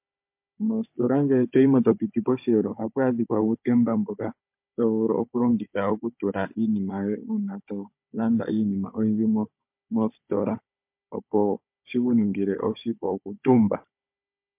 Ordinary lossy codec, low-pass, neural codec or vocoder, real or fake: MP3, 32 kbps; 3.6 kHz; codec, 16 kHz, 16 kbps, FunCodec, trained on Chinese and English, 50 frames a second; fake